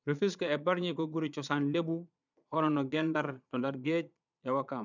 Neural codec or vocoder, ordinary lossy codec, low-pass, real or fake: vocoder, 22.05 kHz, 80 mel bands, Vocos; none; 7.2 kHz; fake